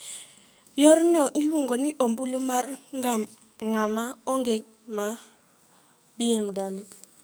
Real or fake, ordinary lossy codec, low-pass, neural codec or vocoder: fake; none; none; codec, 44.1 kHz, 2.6 kbps, SNAC